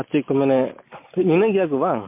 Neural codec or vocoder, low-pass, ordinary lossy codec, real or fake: none; 3.6 kHz; MP3, 24 kbps; real